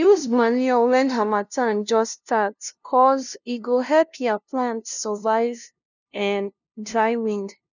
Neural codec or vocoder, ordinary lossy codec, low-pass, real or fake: codec, 16 kHz, 0.5 kbps, FunCodec, trained on LibriTTS, 25 frames a second; none; 7.2 kHz; fake